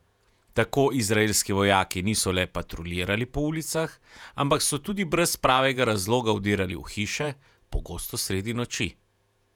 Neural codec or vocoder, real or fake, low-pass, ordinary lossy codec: vocoder, 48 kHz, 128 mel bands, Vocos; fake; 19.8 kHz; none